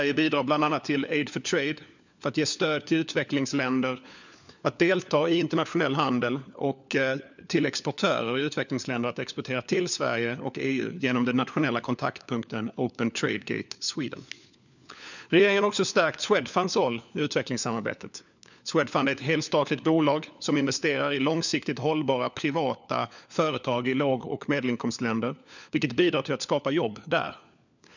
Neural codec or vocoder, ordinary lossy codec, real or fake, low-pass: codec, 16 kHz, 4 kbps, FunCodec, trained on LibriTTS, 50 frames a second; none; fake; 7.2 kHz